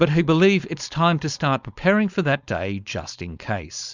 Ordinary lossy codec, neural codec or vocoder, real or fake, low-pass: Opus, 64 kbps; codec, 16 kHz, 4.8 kbps, FACodec; fake; 7.2 kHz